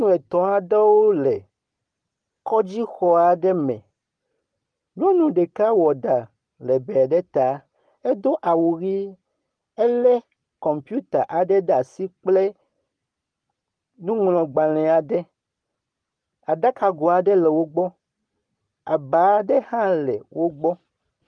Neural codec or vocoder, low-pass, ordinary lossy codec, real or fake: none; 9.9 kHz; Opus, 24 kbps; real